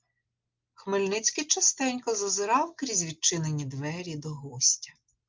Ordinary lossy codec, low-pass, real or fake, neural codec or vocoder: Opus, 32 kbps; 7.2 kHz; real; none